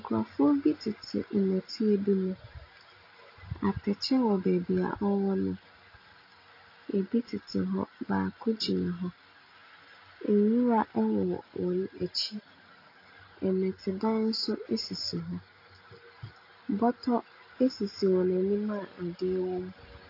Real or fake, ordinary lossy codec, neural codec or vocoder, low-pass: real; AAC, 32 kbps; none; 5.4 kHz